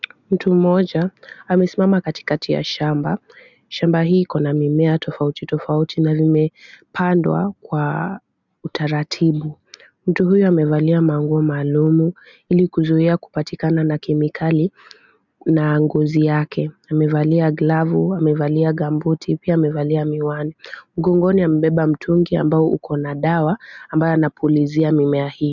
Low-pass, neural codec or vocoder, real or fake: 7.2 kHz; none; real